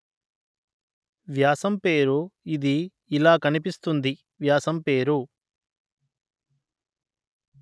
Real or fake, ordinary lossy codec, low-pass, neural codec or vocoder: real; none; none; none